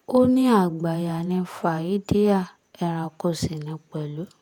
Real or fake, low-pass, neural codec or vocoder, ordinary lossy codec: fake; 19.8 kHz; vocoder, 48 kHz, 128 mel bands, Vocos; none